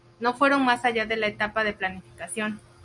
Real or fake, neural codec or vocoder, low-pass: real; none; 10.8 kHz